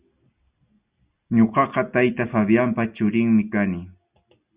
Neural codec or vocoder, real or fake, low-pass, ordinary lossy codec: none; real; 3.6 kHz; Opus, 64 kbps